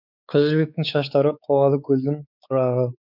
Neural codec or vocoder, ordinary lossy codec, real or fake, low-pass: codec, 16 kHz, 4 kbps, X-Codec, WavLM features, trained on Multilingual LibriSpeech; none; fake; 5.4 kHz